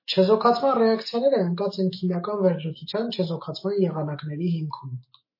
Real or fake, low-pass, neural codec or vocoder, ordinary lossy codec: fake; 5.4 kHz; autoencoder, 48 kHz, 128 numbers a frame, DAC-VAE, trained on Japanese speech; MP3, 24 kbps